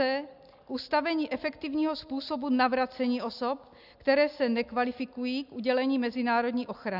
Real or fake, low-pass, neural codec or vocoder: real; 5.4 kHz; none